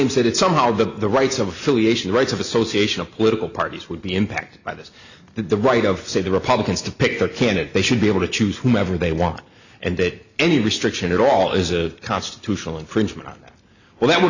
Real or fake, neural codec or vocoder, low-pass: real; none; 7.2 kHz